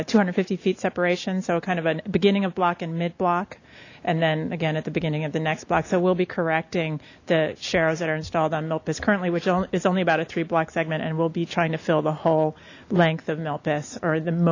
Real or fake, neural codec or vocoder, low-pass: real; none; 7.2 kHz